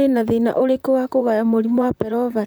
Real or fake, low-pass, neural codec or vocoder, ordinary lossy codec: fake; none; vocoder, 44.1 kHz, 128 mel bands, Pupu-Vocoder; none